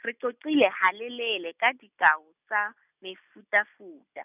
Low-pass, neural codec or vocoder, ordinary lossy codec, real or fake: 3.6 kHz; none; none; real